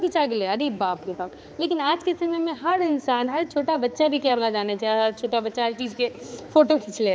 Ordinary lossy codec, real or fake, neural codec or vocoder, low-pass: none; fake; codec, 16 kHz, 4 kbps, X-Codec, HuBERT features, trained on balanced general audio; none